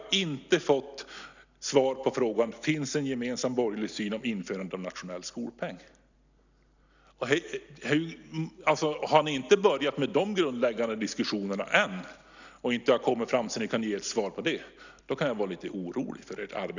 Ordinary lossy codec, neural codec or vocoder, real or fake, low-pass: none; none; real; 7.2 kHz